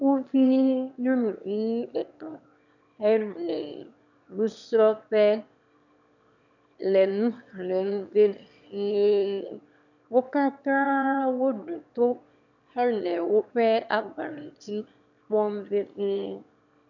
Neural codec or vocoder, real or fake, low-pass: autoencoder, 22.05 kHz, a latent of 192 numbers a frame, VITS, trained on one speaker; fake; 7.2 kHz